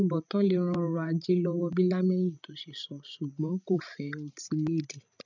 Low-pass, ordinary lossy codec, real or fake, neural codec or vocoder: 7.2 kHz; none; fake; codec, 16 kHz, 16 kbps, FreqCodec, larger model